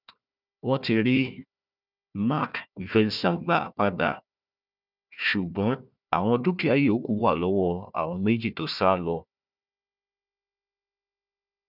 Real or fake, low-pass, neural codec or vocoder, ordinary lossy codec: fake; 5.4 kHz; codec, 16 kHz, 1 kbps, FunCodec, trained on Chinese and English, 50 frames a second; none